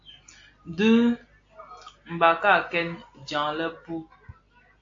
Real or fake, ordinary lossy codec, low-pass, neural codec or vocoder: real; AAC, 48 kbps; 7.2 kHz; none